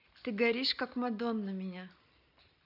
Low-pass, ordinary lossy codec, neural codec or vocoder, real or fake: 5.4 kHz; none; none; real